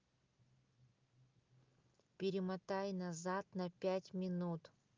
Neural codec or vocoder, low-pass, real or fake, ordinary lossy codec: none; 7.2 kHz; real; Opus, 24 kbps